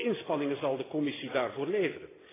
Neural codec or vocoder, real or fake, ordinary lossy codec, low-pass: none; real; AAC, 16 kbps; 3.6 kHz